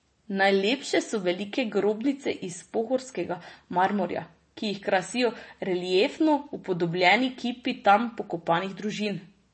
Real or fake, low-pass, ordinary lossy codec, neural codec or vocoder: real; 10.8 kHz; MP3, 32 kbps; none